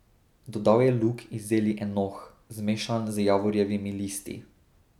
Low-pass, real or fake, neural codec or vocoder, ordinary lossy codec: 19.8 kHz; real; none; none